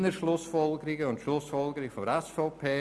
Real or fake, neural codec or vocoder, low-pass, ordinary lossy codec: real; none; none; none